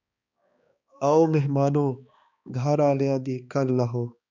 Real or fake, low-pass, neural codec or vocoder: fake; 7.2 kHz; codec, 16 kHz, 2 kbps, X-Codec, HuBERT features, trained on balanced general audio